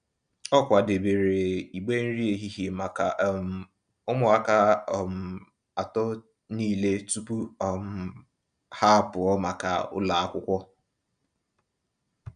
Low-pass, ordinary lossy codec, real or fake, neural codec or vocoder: 9.9 kHz; none; real; none